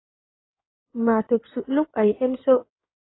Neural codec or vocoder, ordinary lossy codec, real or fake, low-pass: codec, 16 kHz in and 24 kHz out, 2.2 kbps, FireRedTTS-2 codec; AAC, 16 kbps; fake; 7.2 kHz